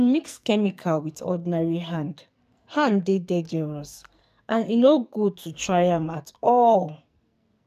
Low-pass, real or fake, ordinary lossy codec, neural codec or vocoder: 14.4 kHz; fake; none; codec, 44.1 kHz, 2.6 kbps, SNAC